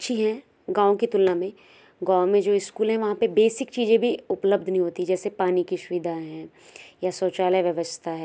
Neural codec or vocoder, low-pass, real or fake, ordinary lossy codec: none; none; real; none